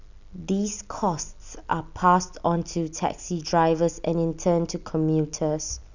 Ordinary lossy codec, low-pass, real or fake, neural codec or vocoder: none; 7.2 kHz; real; none